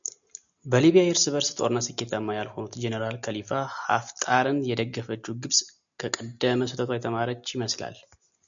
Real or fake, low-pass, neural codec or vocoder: real; 7.2 kHz; none